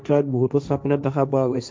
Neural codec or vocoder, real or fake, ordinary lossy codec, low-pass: codec, 16 kHz, 1.1 kbps, Voila-Tokenizer; fake; none; none